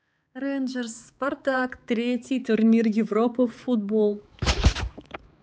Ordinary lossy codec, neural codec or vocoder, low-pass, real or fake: none; codec, 16 kHz, 4 kbps, X-Codec, HuBERT features, trained on balanced general audio; none; fake